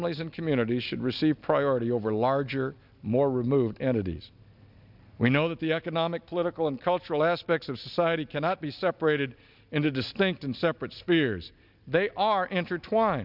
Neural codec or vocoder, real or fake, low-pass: none; real; 5.4 kHz